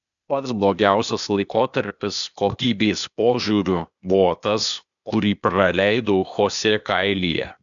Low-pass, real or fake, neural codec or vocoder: 7.2 kHz; fake; codec, 16 kHz, 0.8 kbps, ZipCodec